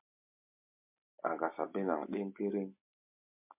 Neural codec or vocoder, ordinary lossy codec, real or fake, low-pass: none; MP3, 32 kbps; real; 3.6 kHz